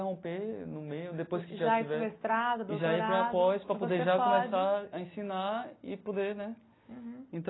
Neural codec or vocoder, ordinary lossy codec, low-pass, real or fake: none; AAC, 16 kbps; 7.2 kHz; real